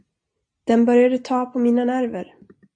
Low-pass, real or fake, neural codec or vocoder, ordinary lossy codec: 9.9 kHz; real; none; AAC, 64 kbps